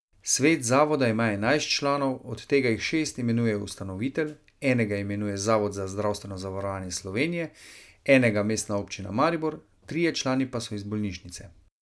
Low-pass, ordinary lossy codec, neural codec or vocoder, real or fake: none; none; none; real